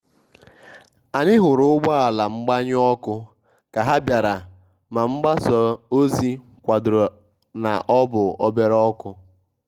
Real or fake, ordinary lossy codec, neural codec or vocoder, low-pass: real; Opus, 24 kbps; none; 19.8 kHz